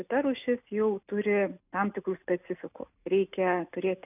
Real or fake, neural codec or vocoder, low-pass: real; none; 3.6 kHz